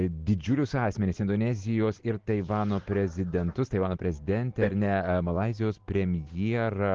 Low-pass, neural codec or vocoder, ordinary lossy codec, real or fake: 7.2 kHz; none; Opus, 16 kbps; real